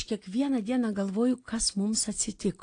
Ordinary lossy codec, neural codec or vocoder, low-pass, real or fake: AAC, 48 kbps; none; 9.9 kHz; real